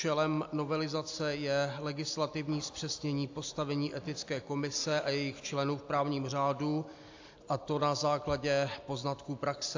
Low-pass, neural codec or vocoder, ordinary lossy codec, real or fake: 7.2 kHz; none; AAC, 48 kbps; real